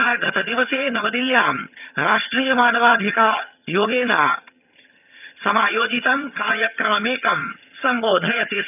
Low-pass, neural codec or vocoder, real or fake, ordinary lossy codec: 3.6 kHz; vocoder, 22.05 kHz, 80 mel bands, HiFi-GAN; fake; none